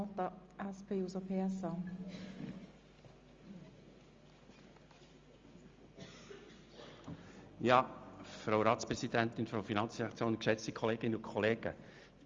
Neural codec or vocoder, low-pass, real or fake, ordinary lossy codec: none; 7.2 kHz; real; Opus, 32 kbps